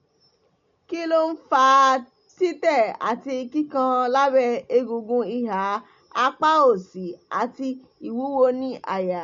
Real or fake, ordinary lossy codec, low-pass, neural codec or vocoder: real; MP3, 48 kbps; 7.2 kHz; none